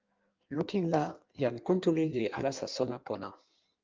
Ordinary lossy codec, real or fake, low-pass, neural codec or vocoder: Opus, 32 kbps; fake; 7.2 kHz; codec, 16 kHz in and 24 kHz out, 1.1 kbps, FireRedTTS-2 codec